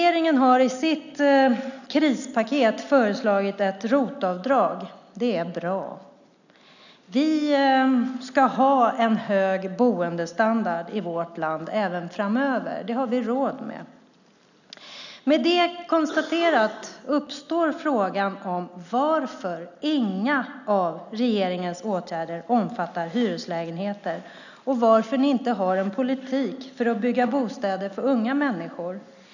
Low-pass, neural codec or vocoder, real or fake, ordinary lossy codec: 7.2 kHz; none; real; none